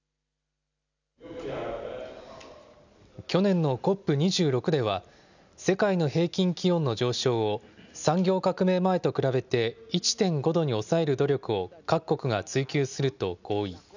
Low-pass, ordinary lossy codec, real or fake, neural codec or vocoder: 7.2 kHz; none; real; none